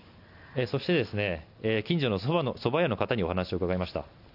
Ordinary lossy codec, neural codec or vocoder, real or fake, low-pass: none; none; real; 5.4 kHz